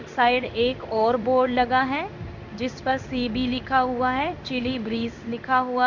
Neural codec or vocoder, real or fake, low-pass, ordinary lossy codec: codec, 16 kHz in and 24 kHz out, 1 kbps, XY-Tokenizer; fake; 7.2 kHz; none